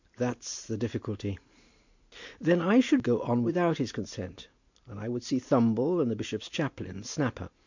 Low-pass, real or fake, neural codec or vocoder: 7.2 kHz; real; none